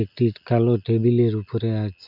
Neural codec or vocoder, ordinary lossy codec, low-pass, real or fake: none; none; 5.4 kHz; real